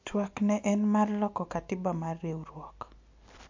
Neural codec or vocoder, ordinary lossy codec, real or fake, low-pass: none; MP3, 48 kbps; real; 7.2 kHz